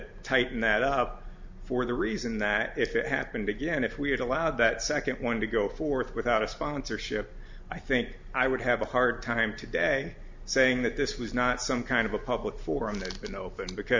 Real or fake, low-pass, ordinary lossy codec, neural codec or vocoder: real; 7.2 kHz; MP3, 64 kbps; none